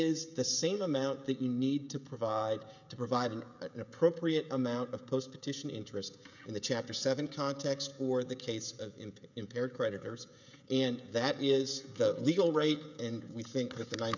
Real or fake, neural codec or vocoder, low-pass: fake; codec, 16 kHz, 16 kbps, FreqCodec, smaller model; 7.2 kHz